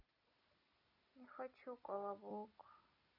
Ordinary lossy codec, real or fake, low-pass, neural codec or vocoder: none; real; 5.4 kHz; none